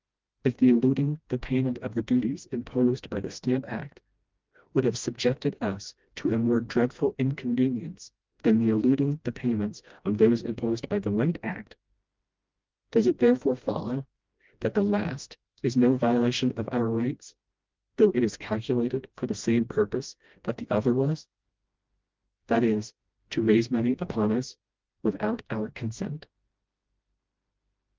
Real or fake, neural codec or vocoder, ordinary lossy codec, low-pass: fake; codec, 16 kHz, 1 kbps, FreqCodec, smaller model; Opus, 24 kbps; 7.2 kHz